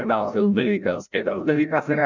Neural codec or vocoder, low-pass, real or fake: codec, 16 kHz, 0.5 kbps, FreqCodec, larger model; 7.2 kHz; fake